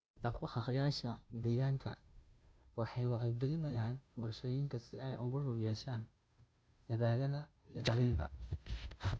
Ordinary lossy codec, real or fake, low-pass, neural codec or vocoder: none; fake; none; codec, 16 kHz, 0.5 kbps, FunCodec, trained on Chinese and English, 25 frames a second